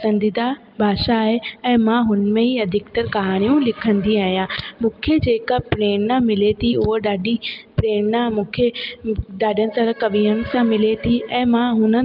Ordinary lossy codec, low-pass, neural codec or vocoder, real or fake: Opus, 24 kbps; 5.4 kHz; none; real